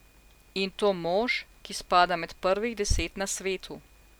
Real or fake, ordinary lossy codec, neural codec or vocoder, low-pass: real; none; none; none